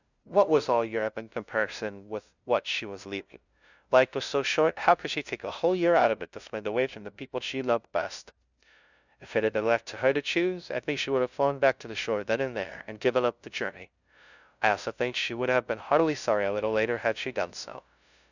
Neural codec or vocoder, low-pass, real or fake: codec, 16 kHz, 0.5 kbps, FunCodec, trained on LibriTTS, 25 frames a second; 7.2 kHz; fake